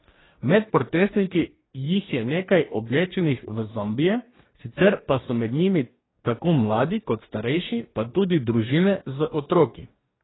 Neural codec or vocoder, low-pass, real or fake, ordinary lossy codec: codec, 44.1 kHz, 2.6 kbps, DAC; 7.2 kHz; fake; AAC, 16 kbps